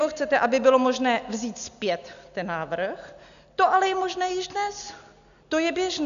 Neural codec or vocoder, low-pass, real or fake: none; 7.2 kHz; real